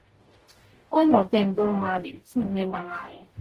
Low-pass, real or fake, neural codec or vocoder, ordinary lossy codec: 14.4 kHz; fake; codec, 44.1 kHz, 0.9 kbps, DAC; Opus, 16 kbps